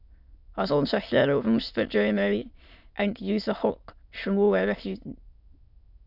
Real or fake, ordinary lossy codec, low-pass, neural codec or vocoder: fake; AAC, 48 kbps; 5.4 kHz; autoencoder, 22.05 kHz, a latent of 192 numbers a frame, VITS, trained on many speakers